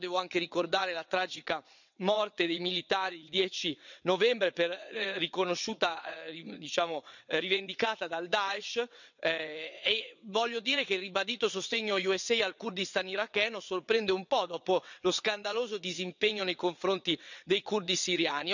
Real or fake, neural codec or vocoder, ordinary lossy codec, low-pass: fake; vocoder, 22.05 kHz, 80 mel bands, WaveNeXt; none; 7.2 kHz